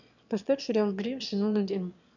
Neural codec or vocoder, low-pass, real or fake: autoencoder, 22.05 kHz, a latent of 192 numbers a frame, VITS, trained on one speaker; 7.2 kHz; fake